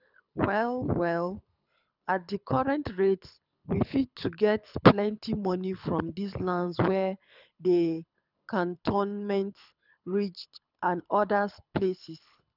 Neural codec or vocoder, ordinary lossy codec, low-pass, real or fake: codec, 24 kHz, 6 kbps, HILCodec; none; 5.4 kHz; fake